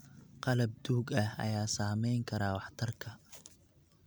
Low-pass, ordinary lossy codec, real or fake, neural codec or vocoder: none; none; real; none